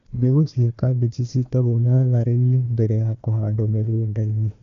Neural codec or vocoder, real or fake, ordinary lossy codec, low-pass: codec, 16 kHz, 1 kbps, FunCodec, trained on Chinese and English, 50 frames a second; fake; none; 7.2 kHz